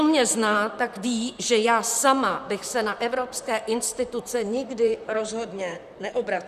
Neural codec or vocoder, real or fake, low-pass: vocoder, 44.1 kHz, 128 mel bands, Pupu-Vocoder; fake; 14.4 kHz